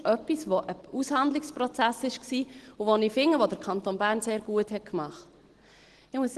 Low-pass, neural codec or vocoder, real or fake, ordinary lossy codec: 10.8 kHz; none; real; Opus, 16 kbps